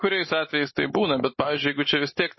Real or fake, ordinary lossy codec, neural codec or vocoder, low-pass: real; MP3, 24 kbps; none; 7.2 kHz